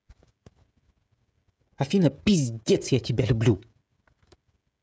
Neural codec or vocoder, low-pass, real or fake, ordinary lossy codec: codec, 16 kHz, 16 kbps, FreqCodec, smaller model; none; fake; none